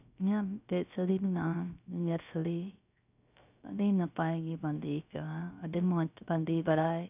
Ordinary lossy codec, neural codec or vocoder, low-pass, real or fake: none; codec, 16 kHz, 0.3 kbps, FocalCodec; 3.6 kHz; fake